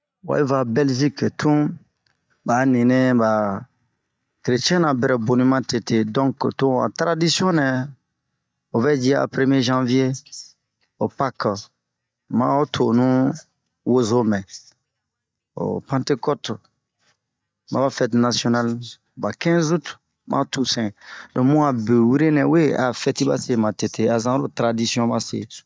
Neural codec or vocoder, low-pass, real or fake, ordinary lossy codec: none; none; real; none